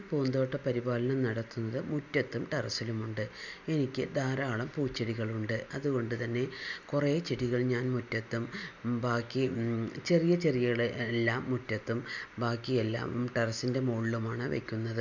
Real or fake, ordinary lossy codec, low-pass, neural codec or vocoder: real; none; 7.2 kHz; none